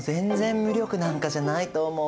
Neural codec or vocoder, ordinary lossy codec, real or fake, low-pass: none; none; real; none